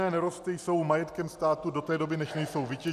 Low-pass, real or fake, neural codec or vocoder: 14.4 kHz; real; none